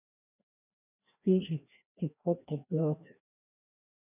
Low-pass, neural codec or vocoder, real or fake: 3.6 kHz; codec, 16 kHz, 1 kbps, FreqCodec, larger model; fake